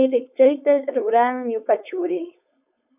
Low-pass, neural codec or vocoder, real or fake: 3.6 kHz; codec, 16 kHz, 4.8 kbps, FACodec; fake